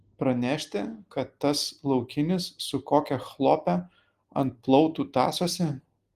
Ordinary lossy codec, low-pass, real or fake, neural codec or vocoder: Opus, 24 kbps; 14.4 kHz; real; none